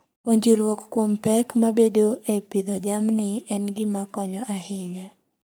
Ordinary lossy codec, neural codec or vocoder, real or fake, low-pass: none; codec, 44.1 kHz, 3.4 kbps, Pupu-Codec; fake; none